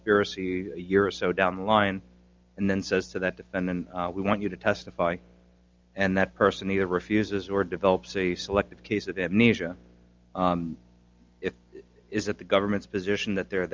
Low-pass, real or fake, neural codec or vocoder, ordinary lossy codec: 7.2 kHz; real; none; Opus, 24 kbps